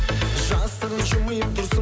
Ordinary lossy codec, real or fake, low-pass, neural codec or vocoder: none; real; none; none